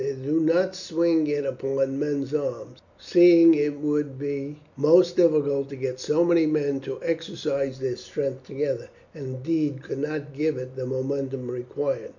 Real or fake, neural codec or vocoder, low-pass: real; none; 7.2 kHz